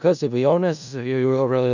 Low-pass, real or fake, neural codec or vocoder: 7.2 kHz; fake; codec, 16 kHz in and 24 kHz out, 0.4 kbps, LongCat-Audio-Codec, four codebook decoder